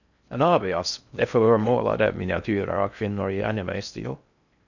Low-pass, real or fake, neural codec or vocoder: 7.2 kHz; fake; codec, 16 kHz in and 24 kHz out, 0.6 kbps, FocalCodec, streaming, 4096 codes